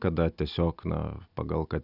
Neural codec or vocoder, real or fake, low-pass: none; real; 5.4 kHz